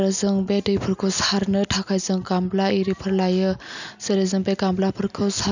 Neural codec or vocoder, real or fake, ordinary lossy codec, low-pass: none; real; none; 7.2 kHz